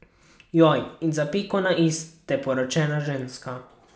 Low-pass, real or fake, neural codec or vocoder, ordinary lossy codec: none; real; none; none